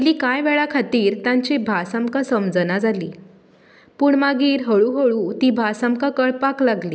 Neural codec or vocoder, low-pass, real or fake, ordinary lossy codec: none; none; real; none